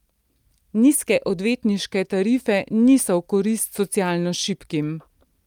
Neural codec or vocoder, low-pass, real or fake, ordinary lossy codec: none; 19.8 kHz; real; Opus, 32 kbps